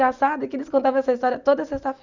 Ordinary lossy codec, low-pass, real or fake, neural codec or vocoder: none; 7.2 kHz; real; none